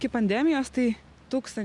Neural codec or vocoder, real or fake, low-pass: none; real; 10.8 kHz